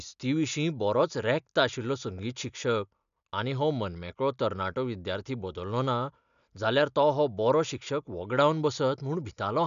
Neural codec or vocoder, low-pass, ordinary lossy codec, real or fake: none; 7.2 kHz; none; real